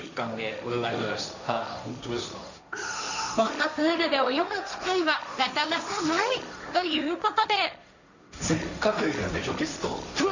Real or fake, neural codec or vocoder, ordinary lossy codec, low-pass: fake; codec, 16 kHz, 1.1 kbps, Voila-Tokenizer; none; 7.2 kHz